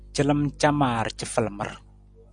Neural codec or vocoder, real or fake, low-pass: none; real; 9.9 kHz